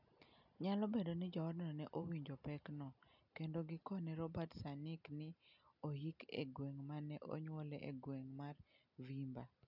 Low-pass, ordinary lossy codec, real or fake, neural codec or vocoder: 5.4 kHz; none; real; none